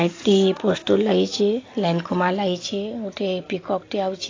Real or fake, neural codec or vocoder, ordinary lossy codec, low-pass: real; none; AAC, 32 kbps; 7.2 kHz